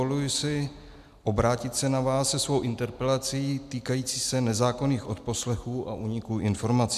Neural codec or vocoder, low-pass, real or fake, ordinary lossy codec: none; 14.4 kHz; real; MP3, 96 kbps